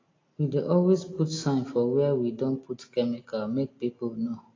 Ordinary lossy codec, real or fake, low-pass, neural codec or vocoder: AAC, 32 kbps; real; 7.2 kHz; none